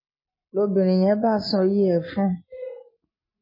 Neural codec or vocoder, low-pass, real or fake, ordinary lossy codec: none; 5.4 kHz; real; MP3, 24 kbps